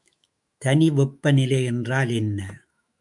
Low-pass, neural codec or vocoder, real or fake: 10.8 kHz; autoencoder, 48 kHz, 128 numbers a frame, DAC-VAE, trained on Japanese speech; fake